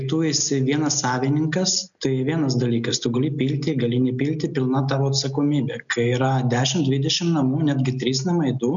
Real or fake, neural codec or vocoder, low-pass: real; none; 7.2 kHz